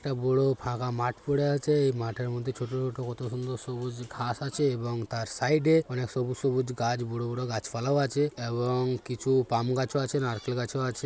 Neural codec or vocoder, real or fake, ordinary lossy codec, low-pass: none; real; none; none